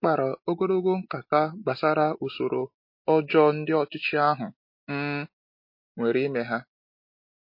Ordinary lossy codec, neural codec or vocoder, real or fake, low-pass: MP3, 32 kbps; none; real; 5.4 kHz